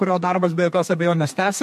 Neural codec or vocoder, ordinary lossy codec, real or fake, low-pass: codec, 32 kHz, 1.9 kbps, SNAC; MP3, 64 kbps; fake; 14.4 kHz